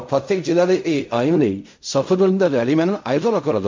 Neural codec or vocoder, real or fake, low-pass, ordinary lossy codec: codec, 16 kHz in and 24 kHz out, 0.4 kbps, LongCat-Audio-Codec, fine tuned four codebook decoder; fake; 7.2 kHz; MP3, 48 kbps